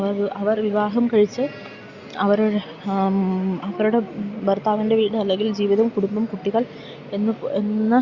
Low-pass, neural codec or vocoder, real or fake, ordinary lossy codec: 7.2 kHz; none; real; Opus, 64 kbps